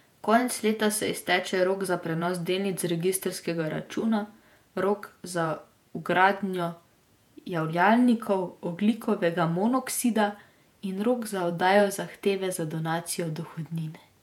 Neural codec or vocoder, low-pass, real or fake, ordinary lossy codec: vocoder, 48 kHz, 128 mel bands, Vocos; 19.8 kHz; fake; MP3, 96 kbps